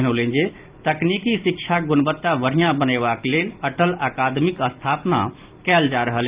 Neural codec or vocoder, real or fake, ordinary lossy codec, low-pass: none; real; Opus, 24 kbps; 3.6 kHz